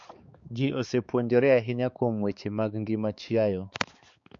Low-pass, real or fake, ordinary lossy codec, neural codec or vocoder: 7.2 kHz; fake; MP3, 48 kbps; codec, 16 kHz, 4 kbps, X-Codec, HuBERT features, trained on balanced general audio